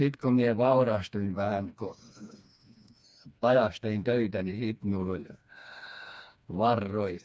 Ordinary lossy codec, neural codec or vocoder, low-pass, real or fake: none; codec, 16 kHz, 2 kbps, FreqCodec, smaller model; none; fake